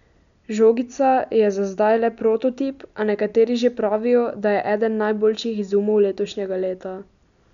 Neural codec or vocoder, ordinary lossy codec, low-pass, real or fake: none; none; 7.2 kHz; real